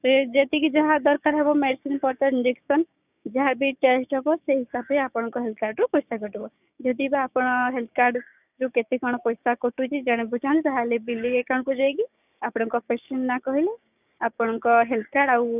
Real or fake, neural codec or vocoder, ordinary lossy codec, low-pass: fake; codec, 16 kHz, 6 kbps, DAC; none; 3.6 kHz